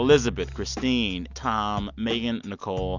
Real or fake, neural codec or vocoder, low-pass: real; none; 7.2 kHz